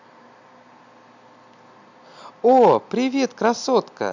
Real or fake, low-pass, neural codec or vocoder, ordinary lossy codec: real; 7.2 kHz; none; none